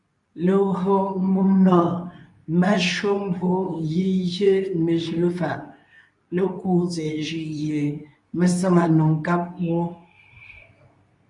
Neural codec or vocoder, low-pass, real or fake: codec, 24 kHz, 0.9 kbps, WavTokenizer, medium speech release version 2; 10.8 kHz; fake